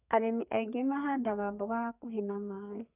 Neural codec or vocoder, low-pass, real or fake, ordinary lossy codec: codec, 32 kHz, 1.9 kbps, SNAC; 3.6 kHz; fake; none